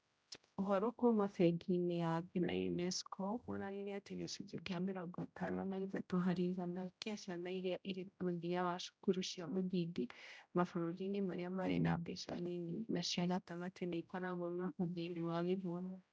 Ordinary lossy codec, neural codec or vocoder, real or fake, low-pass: none; codec, 16 kHz, 0.5 kbps, X-Codec, HuBERT features, trained on general audio; fake; none